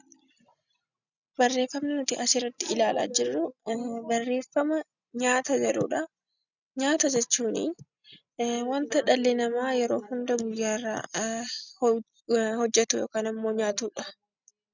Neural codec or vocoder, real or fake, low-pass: none; real; 7.2 kHz